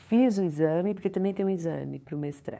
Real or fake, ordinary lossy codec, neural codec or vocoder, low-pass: fake; none; codec, 16 kHz, 2 kbps, FunCodec, trained on LibriTTS, 25 frames a second; none